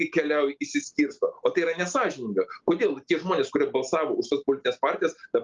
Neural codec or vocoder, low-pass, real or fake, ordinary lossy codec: none; 7.2 kHz; real; Opus, 32 kbps